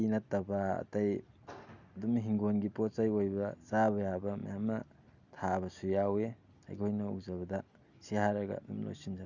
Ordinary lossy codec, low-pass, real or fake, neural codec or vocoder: none; 7.2 kHz; real; none